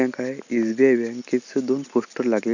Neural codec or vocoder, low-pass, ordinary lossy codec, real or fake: none; 7.2 kHz; none; real